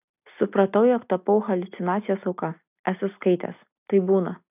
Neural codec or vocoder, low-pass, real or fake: none; 3.6 kHz; real